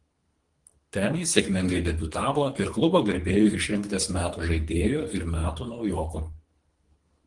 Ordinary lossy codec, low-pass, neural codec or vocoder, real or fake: Opus, 24 kbps; 10.8 kHz; codec, 24 kHz, 3 kbps, HILCodec; fake